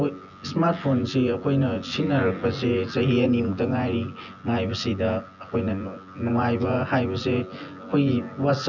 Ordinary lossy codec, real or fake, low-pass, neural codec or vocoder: none; fake; 7.2 kHz; vocoder, 24 kHz, 100 mel bands, Vocos